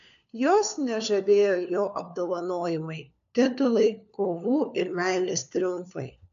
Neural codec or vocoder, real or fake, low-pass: codec, 16 kHz, 4 kbps, FunCodec, trained on LibriTTS, 50 frames a second; fake; 7.2 kHz